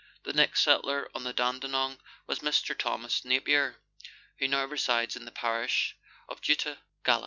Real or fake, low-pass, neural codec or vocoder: real; 7.2 kHz; none